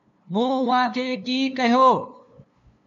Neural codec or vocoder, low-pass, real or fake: codec, 16 kHz, 2 kbps, FunCodec, trained on LibriTTS, 25 frames a second; 7.2 kHz; fake